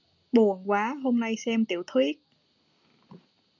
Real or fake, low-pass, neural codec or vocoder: real; 7.2 kHz; none